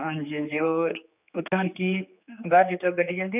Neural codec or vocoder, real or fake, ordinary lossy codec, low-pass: codec, 16 kHz, 4 kbps, X-Codec, HuBERT features, trained on general audio; fake; none; 3.6 kHz